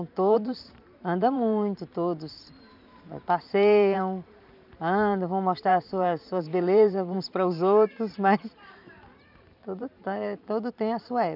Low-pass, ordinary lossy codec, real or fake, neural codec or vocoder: 5.4 kHz; none; fake; vocoder, 44.1 kHz, 128 mel bands every 512 samples, BigVGAN v2